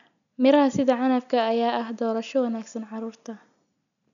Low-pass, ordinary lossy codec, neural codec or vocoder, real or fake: 7.2 kHz; none; none; real